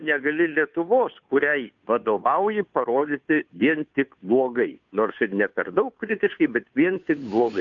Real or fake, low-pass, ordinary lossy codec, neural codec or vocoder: fake; 7.2 kHz; MP3, 96 kbps; codec, 16 kHz, 2 kbps, FunCodec, trained on Chinese and English, 25 frames a second